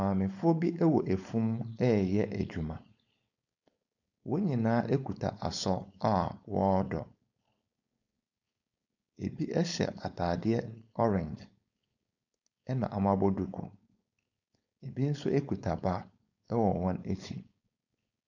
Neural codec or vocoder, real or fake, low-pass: codec, 16 kHz, 4.8 kbps, FACodec; fake; 7.2 kHz